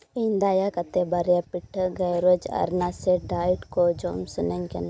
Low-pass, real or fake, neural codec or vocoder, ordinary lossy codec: none; real; none; none